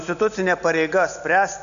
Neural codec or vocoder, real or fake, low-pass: none; real; 7.2 kHz